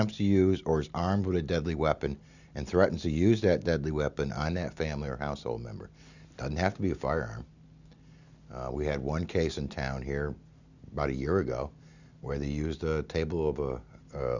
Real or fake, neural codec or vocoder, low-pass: real; none; 7.2 kHz